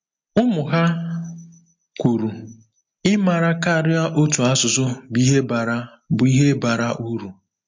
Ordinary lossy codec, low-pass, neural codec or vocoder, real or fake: MP3, 48 kbps; 7.2 kHz; none; real